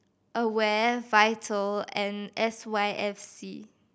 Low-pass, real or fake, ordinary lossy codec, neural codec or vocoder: none; real; none; none